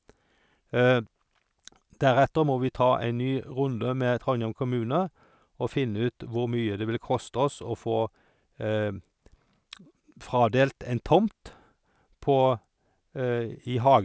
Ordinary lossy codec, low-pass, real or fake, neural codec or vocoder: none; none; real; none